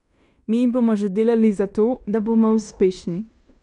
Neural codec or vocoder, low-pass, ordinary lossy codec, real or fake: codec, 16 kHz in and 24 kHz out, 0.9 kbps, LongCat-Audio-Codec, fine tuned four codebook decoder; 10.8 kHz; none; fake